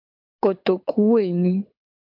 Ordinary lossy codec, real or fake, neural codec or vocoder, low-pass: MP3, 48 kbps; fake; codec, 24 kHz, 6 kbps, HILCodec; 5.4 kHz